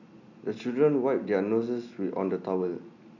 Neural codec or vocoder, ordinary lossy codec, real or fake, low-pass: none; none; real; 7.2 kHz